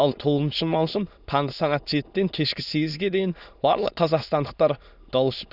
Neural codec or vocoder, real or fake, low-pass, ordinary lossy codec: autoencoder, 22.05 kHz, a latent of 192 numbers a frame, VITS, trained on many speakers; fake; 5.4 kHz; none